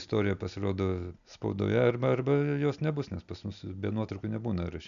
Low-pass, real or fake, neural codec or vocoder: 7.2 kHz; real; none